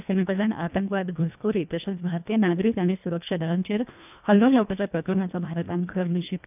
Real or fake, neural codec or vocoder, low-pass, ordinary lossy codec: fake; codec, 24 kHz, 1.5 kbps, HILCodec; 3.6 kHz; none